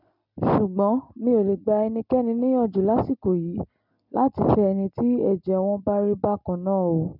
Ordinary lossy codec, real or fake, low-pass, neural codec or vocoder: none; real; 5.4 kHz; none